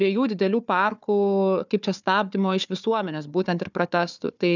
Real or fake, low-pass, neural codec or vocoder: fake; 7.2 kHz; codec, 16 kHz, 4 kbps, FunCodec, trained on Chinese and English, 50 frames a second